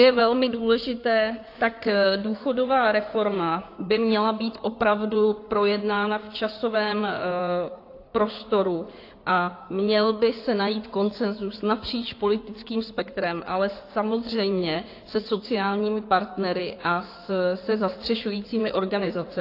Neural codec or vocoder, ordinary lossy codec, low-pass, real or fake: codec, 16 kHz in and 24 kHz out, 2.2 kbps, FireRedTTS-2 codec; AAC, 32 kbps; 5.4 kHz; fake